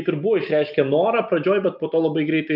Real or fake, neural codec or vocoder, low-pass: real; none; 5.4 kHz